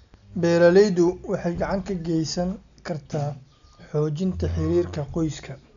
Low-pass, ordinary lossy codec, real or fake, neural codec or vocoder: 7.2 kHz; none; real; none